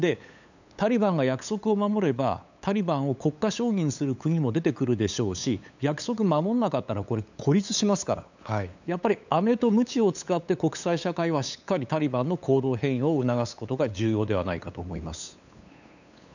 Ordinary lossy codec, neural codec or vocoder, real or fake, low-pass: none; codec, 16 kHz, 8 kbps, FunCodec, trained on LibriTTS, 25 frames a second; fake; 7.2 kHz